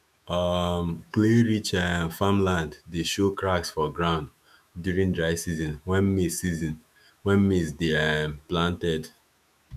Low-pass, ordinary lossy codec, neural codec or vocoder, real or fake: 14.4 kHz; none; autoencoder, 48 kHz, 128 numbers a frame, DAC-VAE, trained on Japanese speech; fake